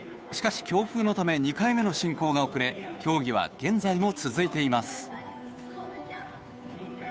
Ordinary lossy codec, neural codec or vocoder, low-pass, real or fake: none; codec, 16 kHz, 2 kbps, FunCodec, trained on Chinese and English, 25 frames a second; none; fake